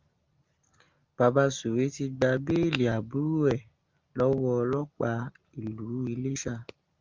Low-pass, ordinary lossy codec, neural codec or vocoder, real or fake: 7.2 kHz; Opus, 32 kbps; none; real